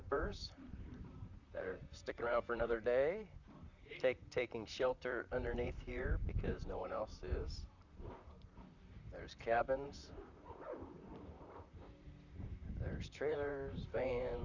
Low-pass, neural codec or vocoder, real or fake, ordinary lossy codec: 7.2 kHz; vocoder, 44.1 kHz, 128 mel bands, Pupu-Vocoder; fake; Opus, 64 kbps